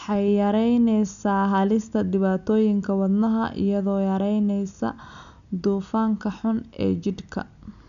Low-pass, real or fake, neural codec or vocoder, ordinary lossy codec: 7.2 kHz; real; none; none